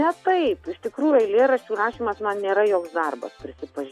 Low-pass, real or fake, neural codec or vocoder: 14.4 kHz; real; none